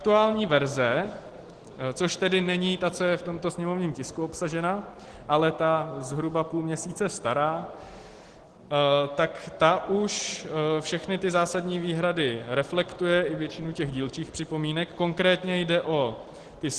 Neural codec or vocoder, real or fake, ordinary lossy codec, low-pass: none; real; Opus, 16 kbps; 10.8 kHz